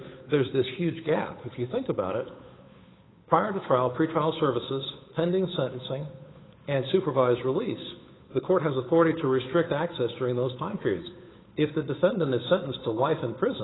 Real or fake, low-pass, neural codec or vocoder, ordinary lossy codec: fake; 7.2 kHz; codec, 16 kHz, 8 kbps, FunCodec, trained on Chinese and English, 25 frames a second; AAC, 16 kbps